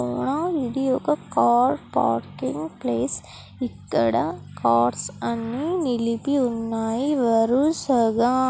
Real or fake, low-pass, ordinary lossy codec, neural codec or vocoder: real; none; none; none